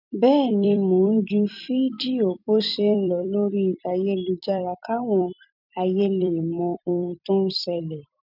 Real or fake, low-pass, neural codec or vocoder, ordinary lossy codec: fake; 5.4 kHz; vocoder, 44.1 kHz, 128 mel bands every 256 samples, BigVGAN v2; none